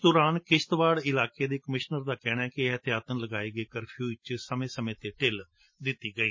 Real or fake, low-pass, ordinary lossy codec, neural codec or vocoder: real; 7.2 kHz; MP3, 32 kbps; none